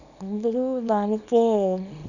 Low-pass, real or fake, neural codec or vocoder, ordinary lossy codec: 7.2 kHz; fake; codec, 24 kHz, 0.9 kbps, WavTokenizer, small release; none